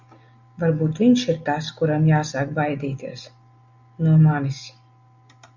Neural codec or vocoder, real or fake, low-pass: none; real; 7.2 kHz